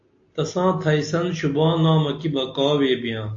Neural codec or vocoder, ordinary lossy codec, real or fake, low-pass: none; AAC, 48 kbps; real; 7.2 kHz